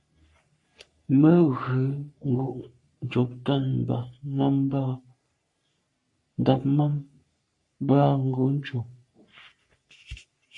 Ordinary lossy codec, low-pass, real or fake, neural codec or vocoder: MP3, 48 kbps; 10.8 kHz; fake; codec, 44.1 kHz, 3.4 kbps, Pupu-Codec